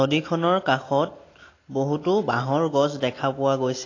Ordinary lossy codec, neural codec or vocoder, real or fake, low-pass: AAC, 32 kbps; none; real; 7.2 kHz